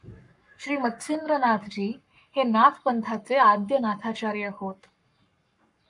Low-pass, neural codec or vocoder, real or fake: 10.8 kHz; codec, 44.1 kHz, 7.8 kbps, Pupu-Codec; fake